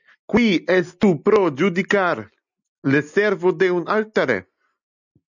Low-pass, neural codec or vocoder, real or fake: 7.2 kHz; none; real